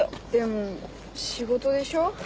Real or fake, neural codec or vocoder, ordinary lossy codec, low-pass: real; none; none; none